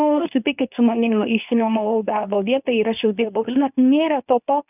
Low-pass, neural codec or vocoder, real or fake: 3.6 kHz; codec, 24 kHz, 0.9 kbps, WavTokenizer, medium speech release version 2; fake